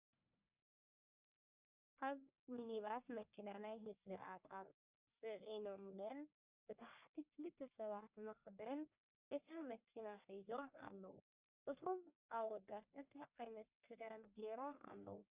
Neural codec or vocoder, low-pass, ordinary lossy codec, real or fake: codec, 44.1 kHz, 1.7 kbps, Pupu-Codec; 3.6 kHz; Opus, 64 kbps; fake